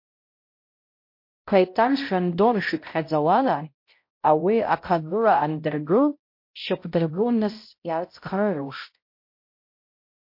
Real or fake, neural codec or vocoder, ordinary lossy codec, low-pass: fake; codec, 16 kHz, 0.5 kbps, X-Codec, HuBERT features, trained on balanced general audio; MP3, 32 kbps; 5.4 kHz